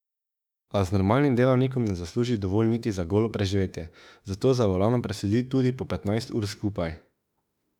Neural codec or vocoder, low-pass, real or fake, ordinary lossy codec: autoencoder, 48 kHz, 32 numbers a frame, DAC-VAE, trained on Japanese speech; 19.8 kHz; fake; none